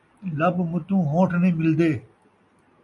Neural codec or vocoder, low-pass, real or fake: none; 10.8 kHz; real